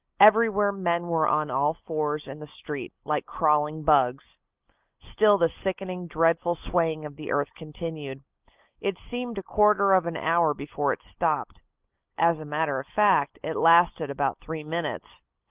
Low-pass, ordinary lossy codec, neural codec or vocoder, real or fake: 3.6 kHz; Opus, 32 kbps; none; real